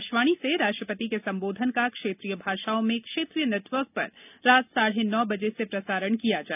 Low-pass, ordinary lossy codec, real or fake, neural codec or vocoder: 3.6 kHz; none; real; none